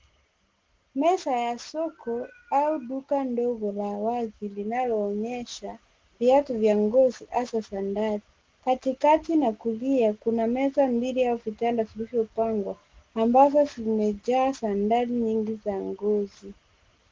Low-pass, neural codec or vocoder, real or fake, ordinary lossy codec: 7.2 kHz; none; real; Opus, 16 kbps